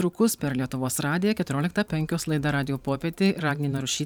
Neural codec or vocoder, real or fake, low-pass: vocoder, 44.1 kHz, 128 mel bands every 512 samples, BigVGAN v2; fake; 19.8 kHz